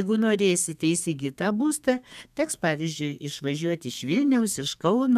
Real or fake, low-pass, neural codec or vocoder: fake; 14.4 kHz; codec, 32 kHz, 1.9 kbps, SNAC